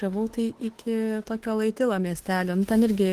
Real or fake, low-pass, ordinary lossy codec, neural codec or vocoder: fake; 14.4 kHz; Opus, 16 kbps; autoencoder, 48 kHz, 32 numbers a frame, DAC-VAE, trained on Japanese speech